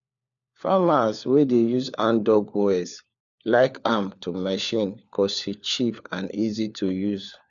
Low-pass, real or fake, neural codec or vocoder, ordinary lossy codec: 7.2 kHz; fake; codec, 16 kHz, 4 kbps, FunCodec, trained on LibriTTS, 50 frames a second; none